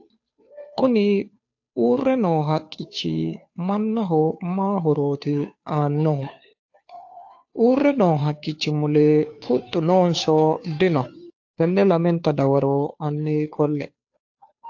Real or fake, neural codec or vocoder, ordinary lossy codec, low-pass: fake; codec, 16 kHz, 2 kbps, FunCodec, trained on Chinese and English, 25 frames a second; AAC, 48 kbps; 7.2 kHz